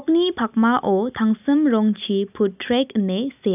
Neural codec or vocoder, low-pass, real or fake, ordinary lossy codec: none; 3.6 kHz; real; none